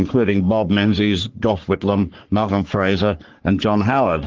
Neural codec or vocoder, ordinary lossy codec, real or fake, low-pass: codec, 44.1 kHz, 3.4 kbps, Pupu-Codec; Opus, 16 kbps; fake; 7.2 kHz